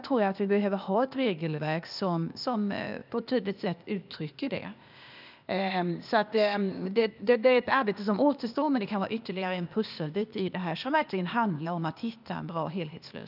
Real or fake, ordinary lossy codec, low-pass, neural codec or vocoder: fake; none; 5.4 kHz; codec, 16 kHz, 0.8 kbps, ZipCodec